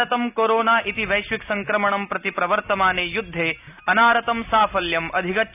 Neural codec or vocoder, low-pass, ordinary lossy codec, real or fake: none; 3.6 kHz; none; real